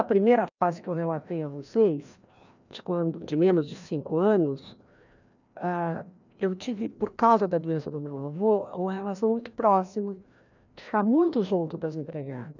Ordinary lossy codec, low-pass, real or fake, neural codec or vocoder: none; 7.2 kHz; fake; codec, 16 kHz, 1 kbps, FreqCodec, larger model